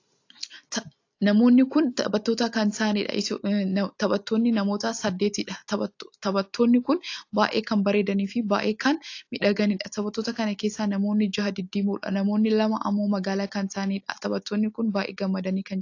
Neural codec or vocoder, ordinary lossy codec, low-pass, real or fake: none; AAC, 48 kbps; 7.2 kHz; real